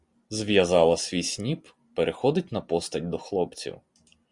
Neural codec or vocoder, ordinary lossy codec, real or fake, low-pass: none; Opus, 64 kbps; real; 10.8 kHz